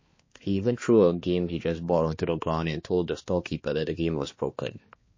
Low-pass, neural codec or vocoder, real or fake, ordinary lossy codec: 7.2 kHz; codec, 16 kHz, 2 kbps, X-Codec, HuBERT features, trained on balanced general audio; fake; MP3, 32 kbps